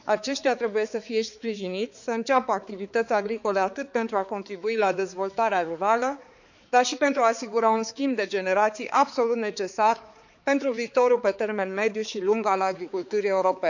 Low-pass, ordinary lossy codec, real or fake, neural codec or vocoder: 7.2 kHz; none; fake; codec, 16 kHz, 4 kbps, X-Codec, HuBERT features, trained on balanced general audio